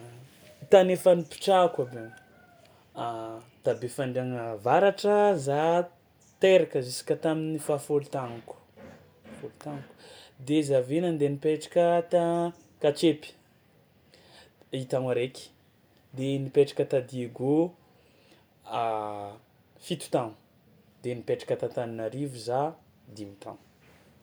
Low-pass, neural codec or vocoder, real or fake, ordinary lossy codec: none; none; real; none